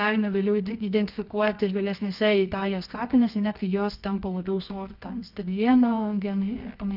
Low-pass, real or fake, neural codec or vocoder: 5.4 kHz; fake; codec, 24 kHz, 0.9 kbps, WavTokenizer, medium music audio release